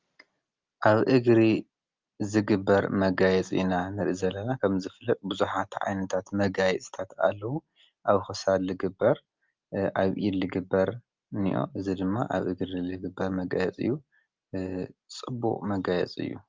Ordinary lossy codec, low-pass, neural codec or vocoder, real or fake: Opus, 32 kbps; 7.2 kHz; none; real